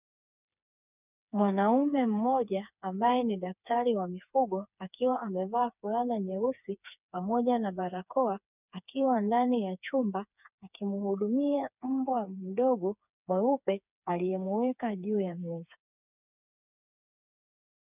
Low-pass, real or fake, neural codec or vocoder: 3.6 kHz; fake; codec, 16 kHz, 4 kbps, FreqCodec, smaller model